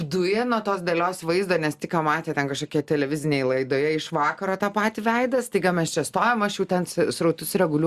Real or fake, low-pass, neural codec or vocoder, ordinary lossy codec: real; 14.4 kHz; none; Opus, 64 kbps